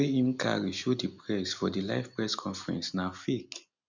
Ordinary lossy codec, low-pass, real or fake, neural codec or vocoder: none; 7.2 kHz; real; none